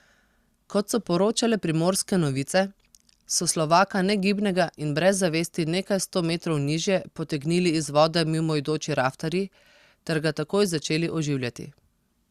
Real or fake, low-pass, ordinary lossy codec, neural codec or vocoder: real; 14.4 kHz; Opus, 64 kbps; none